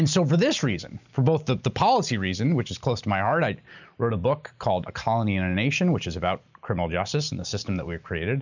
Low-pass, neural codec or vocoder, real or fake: 7.2 kHz; none; real